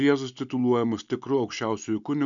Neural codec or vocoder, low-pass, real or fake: none; 7.2 kHz; real